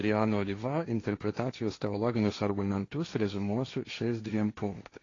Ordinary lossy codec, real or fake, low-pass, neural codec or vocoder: AAC, 32 kbps; fake; 7.2 kHz; codec, 16 kHz, 1.1 kbps, Voila-Tokenizer